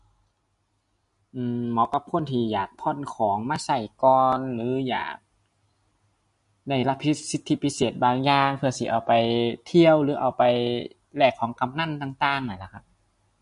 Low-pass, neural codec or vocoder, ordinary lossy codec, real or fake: 10.8 kHz; none; MP3, 48 kbps; real